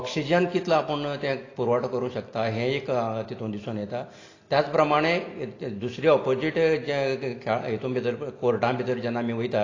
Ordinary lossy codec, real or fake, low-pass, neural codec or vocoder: AAC, 32 kbps; real; 7.2 kHz; none